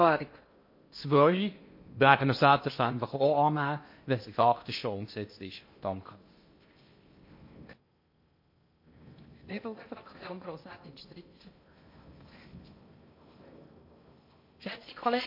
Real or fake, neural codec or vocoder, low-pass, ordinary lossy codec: fake; codec, 16 kHz in and 24 kHz out, 0.6 kbps, FocalCodec, streaming, 2048 codes; 5.4 kHz; MP3, 32 kbps